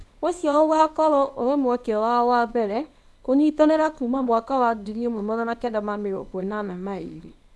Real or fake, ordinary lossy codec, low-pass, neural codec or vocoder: fake; none; none; codec, 24 kHz, 0.9 kbps, WavTokenizer, small release